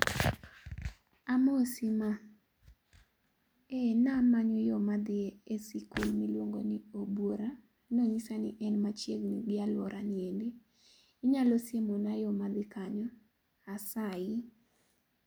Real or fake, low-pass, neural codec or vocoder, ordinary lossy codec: real; none; none; none